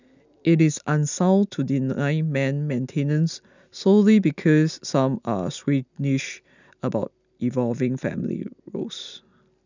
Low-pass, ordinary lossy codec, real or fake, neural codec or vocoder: 7.2 kHz; none; real; none